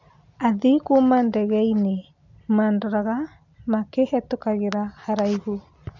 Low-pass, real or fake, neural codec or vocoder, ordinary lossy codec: 7.2 kHz; real; none; none